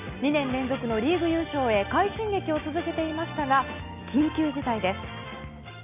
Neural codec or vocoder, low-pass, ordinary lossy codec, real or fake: none; 3.6 kHz; AAC, 32 kbps; real